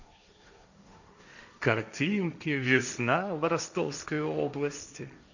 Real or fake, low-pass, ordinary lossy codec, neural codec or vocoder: fake; 7.2 kHz; none; codec, 16 kHz, 1.1 kbps, Voila-Tokenizer